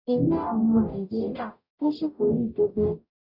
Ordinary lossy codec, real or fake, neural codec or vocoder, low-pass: none; fake; codec, 44.1 kHz, 0.9 kbps, DAC; 5.4 kHz